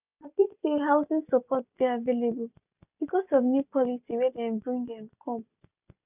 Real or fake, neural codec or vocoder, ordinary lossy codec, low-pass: real; none; none; 3.6 kHz